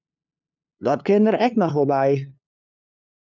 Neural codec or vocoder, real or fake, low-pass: codec, 16 kHz, 2 kbps, FunCodec, trained on LibriTTS, 25 frames a second; fake; 7.2 kHz